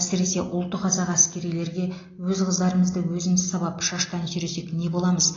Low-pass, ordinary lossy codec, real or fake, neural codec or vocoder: 7.2 kHz; AAC, 32 kbps; real; none